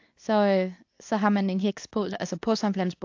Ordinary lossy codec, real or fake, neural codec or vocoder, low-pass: AAC, 48 kbps; fake; codec, 24 kHz, 0.9 kbps, WavTokenizer, medium speech release version 2; 7.2 kHz